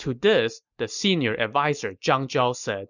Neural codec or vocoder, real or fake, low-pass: none; real; 7.2 kHz